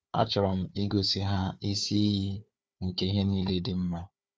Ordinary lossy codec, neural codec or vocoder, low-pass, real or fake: none; codec, 16 kHz, 4 kbps, FunCodec, trained on Chinese and English, 50 frames a second; none; fake